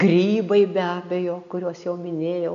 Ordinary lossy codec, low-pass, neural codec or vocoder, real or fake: AAC, 96 kbps; 7.2 kHz; none; real